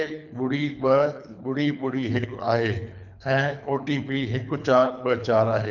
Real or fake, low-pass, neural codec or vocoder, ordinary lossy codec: fake; 7.2 kHz; codec, 24 kHz, 3 kbps, HILCodec; none